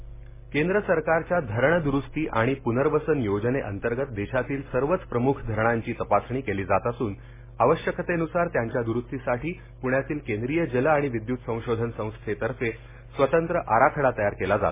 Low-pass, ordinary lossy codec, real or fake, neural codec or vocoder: 3.6 kHz; MP3, 16 kbps; real; none